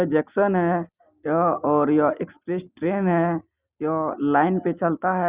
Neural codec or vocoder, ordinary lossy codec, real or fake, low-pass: none; Opus, 64 kbps; real; 3.6 kHz